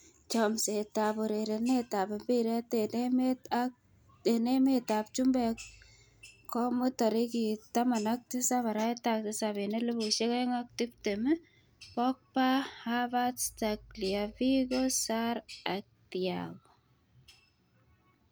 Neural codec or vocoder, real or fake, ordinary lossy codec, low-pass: none; real; none; none